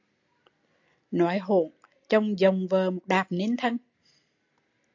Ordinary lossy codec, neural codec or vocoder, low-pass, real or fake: AAC, 48 kbps; none; 7.2 kHz; real